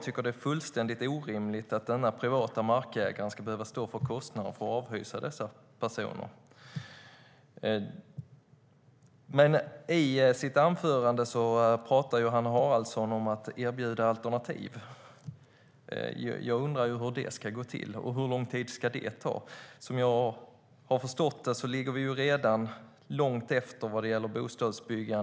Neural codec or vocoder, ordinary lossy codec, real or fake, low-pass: none; none; real; none